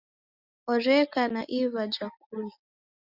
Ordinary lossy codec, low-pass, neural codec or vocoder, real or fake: Opus, 64 kbps; 5.4 kHz; none; real